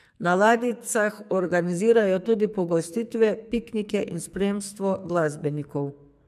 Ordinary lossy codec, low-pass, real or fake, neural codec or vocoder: MP3, 96 kbps; 14.4 kHz; fake; codec, 44.1 kHz, 2.6 kbps, SNAC